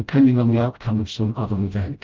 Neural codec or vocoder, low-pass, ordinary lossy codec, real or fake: codec, 16 kHz, 0.5 kbps, FreqCodec, smaller model; 7.2 kHz; Opus, 24 kbps; fake